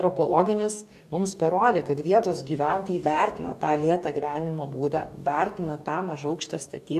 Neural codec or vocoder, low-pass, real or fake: codec, 44.1 kHz, 2.6 kbps, DAC; 14.4 kHz; fake